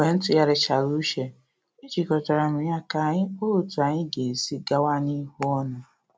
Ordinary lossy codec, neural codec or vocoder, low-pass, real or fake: none; none; none; real